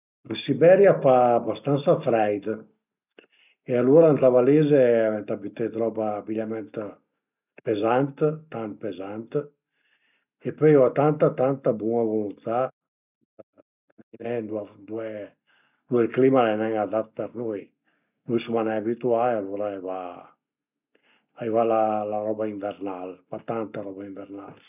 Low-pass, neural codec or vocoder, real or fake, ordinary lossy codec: 3.6 kHz; none; real; none